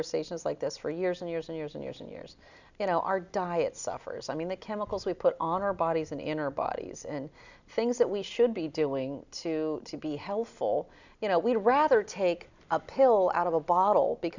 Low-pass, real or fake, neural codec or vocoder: 7.2 kHz; real; none